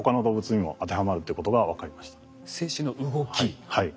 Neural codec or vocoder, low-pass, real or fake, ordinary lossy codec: none; none; real; none